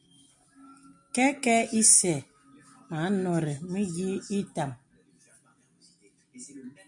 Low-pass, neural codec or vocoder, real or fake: 10.8 kHz; none; real